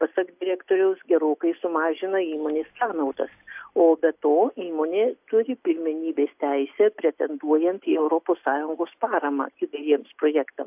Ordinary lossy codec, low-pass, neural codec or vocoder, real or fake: AAC, 32 kbps; 3.6 kHz; none; real